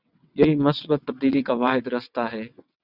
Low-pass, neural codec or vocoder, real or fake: 5.4 kHz; vocoder, 22.05 kHz, 80 mel bands, WaveNeXt; fake